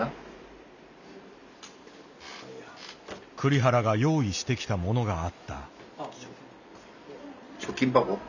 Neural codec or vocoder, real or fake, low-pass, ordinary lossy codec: none; real; 7.2 kHz; none